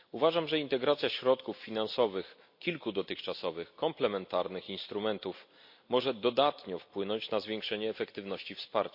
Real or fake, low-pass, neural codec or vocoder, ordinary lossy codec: real; 5.4 kHz; none; MP3, 32 kbps